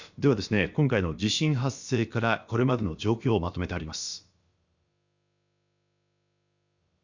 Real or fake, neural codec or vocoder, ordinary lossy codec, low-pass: fake; codec, 16 kHz, about 1 kbps, DyCAST, with the encoder's durations; Opus, 64 kbps; 7.2 kHz